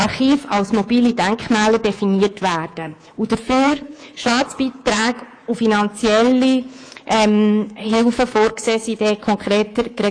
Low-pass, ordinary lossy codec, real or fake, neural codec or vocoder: 9.9 kHz; AAC, 48 kbps; fake; codec, 24 kHz, 3.1 kbps, DualCodec